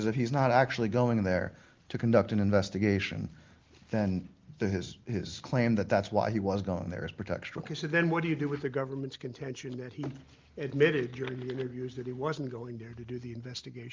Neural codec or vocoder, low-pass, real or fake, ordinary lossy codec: none; 7.2 kHz; real; Opus, 32 kbps